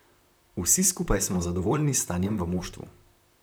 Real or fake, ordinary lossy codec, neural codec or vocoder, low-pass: fake; none; vocoder, 44.1 kHz, 128 mel bands, Pupu-Vocoder; none